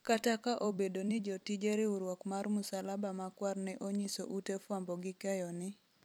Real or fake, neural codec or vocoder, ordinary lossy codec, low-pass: fake; vocoder, 44.1 kHz, 128 mel bands every 256 samples, BigVGAN v2; none; none